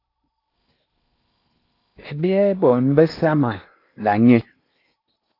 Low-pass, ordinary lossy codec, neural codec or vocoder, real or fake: 5.4 kHz; AAC, 32 kbps; codec, 16 kHz in and 24 kHz out, 0.8 kbps, FocalCodec, streaming, 65536 codes; fake